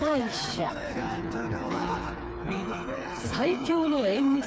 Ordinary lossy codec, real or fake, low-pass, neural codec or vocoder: none; fake; none; codec, 16 kHz, 4 kbps, FreqCodec, smaller model